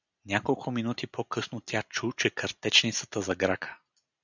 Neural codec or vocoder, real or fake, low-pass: none; real; 7.2 kHz